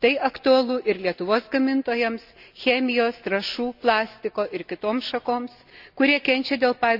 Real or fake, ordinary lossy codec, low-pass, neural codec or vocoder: real; none; 5.4 kHz; none